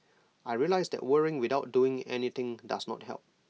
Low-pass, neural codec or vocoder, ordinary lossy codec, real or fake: none; none; none; real